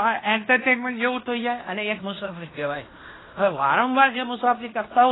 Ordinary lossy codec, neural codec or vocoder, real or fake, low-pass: AAC, 16 kbps; codec, 16 kHz in and 24 kHz out, 0.9 kbps, LongCat-Audio-Codec, fine tuned four codebook decoder; fake; 7.2 kHz